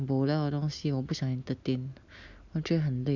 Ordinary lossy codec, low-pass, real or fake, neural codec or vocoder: none; 7.2 kHz; real; none